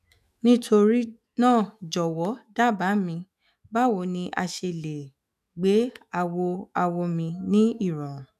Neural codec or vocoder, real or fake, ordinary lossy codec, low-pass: autoencoder, 48 kHz, 128 numbers a frame, DAC-VAE, trained on Japanese speech; fake; none; 14.4 kHz